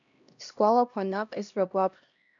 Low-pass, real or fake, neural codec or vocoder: 7.2 kHz; fake; codec, 16 kHz, 1 kbps, X-Codec, HuBERT features, trained on LibriSpeech